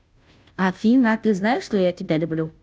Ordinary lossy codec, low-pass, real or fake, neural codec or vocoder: none; none; fake; codec, 16 kHz, 0.5 kbps, FunCodec, trained on Chinese and English, 25 frames a second